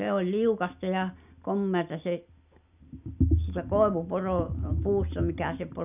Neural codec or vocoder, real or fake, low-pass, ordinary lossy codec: none; real; 3.6 kHz; none